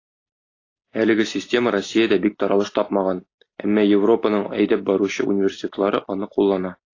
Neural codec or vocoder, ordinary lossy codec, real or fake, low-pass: none; AAC, 32 kbps; real; 7.2 kHz